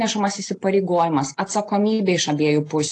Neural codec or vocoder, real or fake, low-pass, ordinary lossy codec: none; real; 9.9 kHz; AAC, 48 kbps